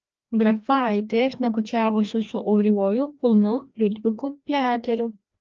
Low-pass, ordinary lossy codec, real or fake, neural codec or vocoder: 7.2 kHz; Opus, 24 kbps; fake; codec, 16 kHz, 1 kbps, FreqCodec, larger model